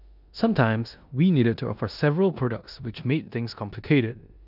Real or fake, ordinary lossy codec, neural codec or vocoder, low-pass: fake; none; codec, 16 kHz in and 24 kHz out, 0.9 kbps, LongCat-Audio-Codec, four codebook decoder; 5.4 kHz